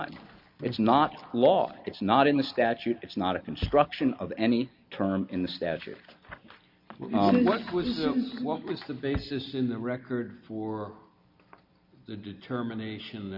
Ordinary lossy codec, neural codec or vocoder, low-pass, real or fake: MP3, 48 kbps; none; 5.4 kHz; real